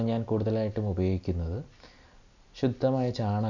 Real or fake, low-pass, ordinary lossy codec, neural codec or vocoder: real; 7.2 kHz; none; none